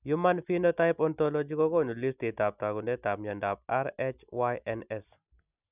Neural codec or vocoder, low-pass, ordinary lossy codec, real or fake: none; 3.6 kHz; none; real